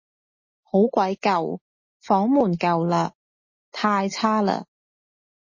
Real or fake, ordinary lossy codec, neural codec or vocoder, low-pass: real; MP3, 32 kbps; none; 7.2 kHz